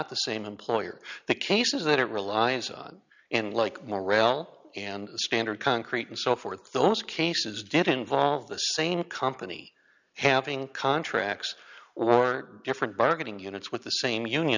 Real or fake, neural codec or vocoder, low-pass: real; none; 7.2 kHz